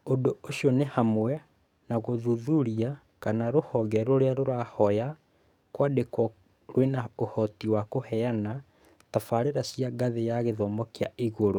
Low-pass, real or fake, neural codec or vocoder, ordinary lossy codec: 19.8 kHz; fake; codec, 44.1 kHz, 7.8 kbps, DAC; none